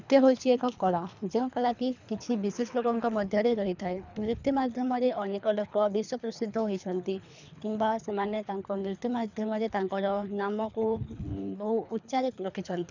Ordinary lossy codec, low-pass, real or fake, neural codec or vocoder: none; 7.2 kHz; fake; codec, 24 kHz, 3 kbps, HILCodec